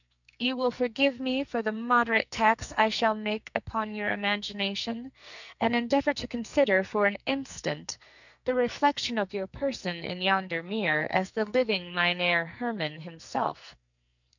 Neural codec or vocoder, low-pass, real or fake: codec, 44.1 kHz, 2.6 kbps, SNAC; 7.2 kHz; fake